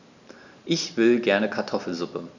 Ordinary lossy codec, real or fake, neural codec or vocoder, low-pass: none; real; none; 7.2 kHz